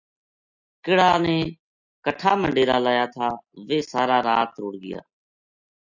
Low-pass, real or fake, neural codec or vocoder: 7.2 kHz; real; none